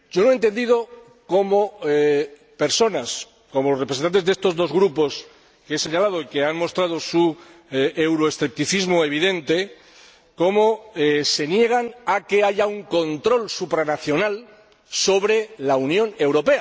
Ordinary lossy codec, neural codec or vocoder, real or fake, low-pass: none; none; real; none